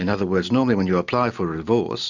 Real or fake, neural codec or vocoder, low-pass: real; none; 7.2 kHz